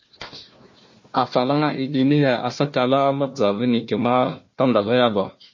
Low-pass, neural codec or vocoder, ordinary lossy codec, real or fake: 7.2 kHz; codec, 16 kHz, 1 kbps, FunCodec, trained on Chinese and English, 50 frames a second; MP3, 32 kbps; fake